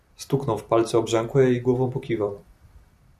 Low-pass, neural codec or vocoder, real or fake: 14.4 kHz; vocoder, 44.1 kHz, 128 mel bands every 256 samples, BigVGAN v2; fake